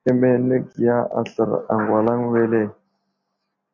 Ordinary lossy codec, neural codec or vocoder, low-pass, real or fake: MP3, 48 kbps; none; 7.2 kHz; real